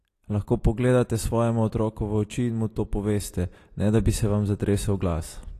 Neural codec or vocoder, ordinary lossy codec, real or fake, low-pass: none; AAC, 48 kbps; real; 14.4 kHz